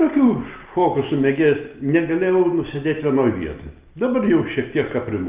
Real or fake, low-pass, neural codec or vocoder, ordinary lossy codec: real; 3.6 kHz; none; Opus, 32 kbps